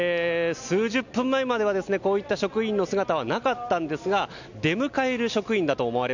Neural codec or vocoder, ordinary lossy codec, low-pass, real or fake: none; none; 7.2 kHz; real